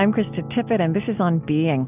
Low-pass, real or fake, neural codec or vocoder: 3.6 kHz; real; none